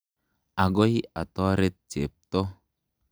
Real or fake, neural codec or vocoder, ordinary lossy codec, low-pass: real; none; none; none